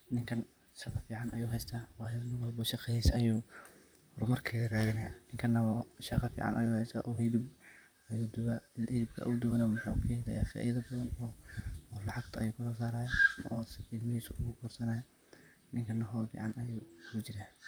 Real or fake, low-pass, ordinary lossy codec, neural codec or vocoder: fake; none; none; vocoder, 44.1 kHz, 128 mel bands, Pupu-Vocoder